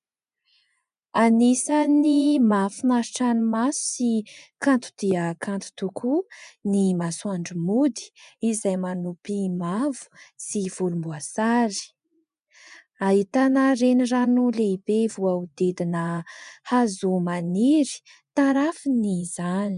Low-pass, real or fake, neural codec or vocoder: 10.8 kHz; fake; vocoder, 24 kHz, 100 mel bands, Vocos